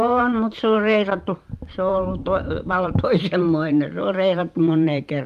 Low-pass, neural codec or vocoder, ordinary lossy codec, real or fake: 14.4 kHz; vocoder, 44.1 kHz, 128 mel bands every 512 samples, BigVGAN v2; Opus, 32 kbps; fake